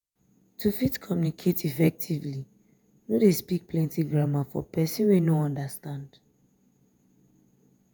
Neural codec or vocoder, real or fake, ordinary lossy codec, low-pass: vocoder, 48 kHz, 128 mel bands, Vocos; fake; none; none